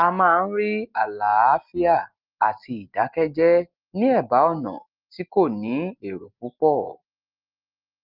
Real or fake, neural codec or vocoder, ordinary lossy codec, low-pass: real; none; Opus, 32 kbps; 5.4 kHz